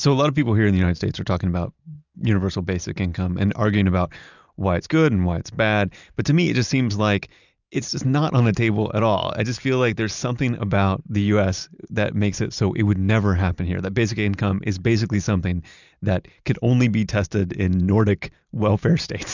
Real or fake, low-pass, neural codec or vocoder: real; 7.2 kHz; none